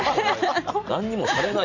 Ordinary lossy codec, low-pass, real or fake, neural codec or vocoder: none; 7.2 kHz; real; none